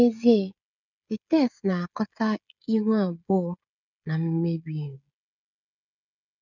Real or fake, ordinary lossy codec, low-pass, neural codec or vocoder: fake; none; 7.2 kHz; codec, 16 kHz, 16 kbps, FreqCodec, smaller model